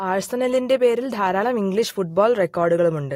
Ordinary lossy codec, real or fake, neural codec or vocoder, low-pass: AAC, 48 kbps; real; none; 19.8 kHz